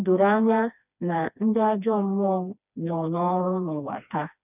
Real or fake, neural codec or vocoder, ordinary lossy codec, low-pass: fake; codec, 16 kHz, 2 kbps, FreqCodec, smaller model; none; 3.6 kHz